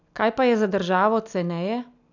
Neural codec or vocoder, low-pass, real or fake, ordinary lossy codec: none; 7.2 kHz; real; none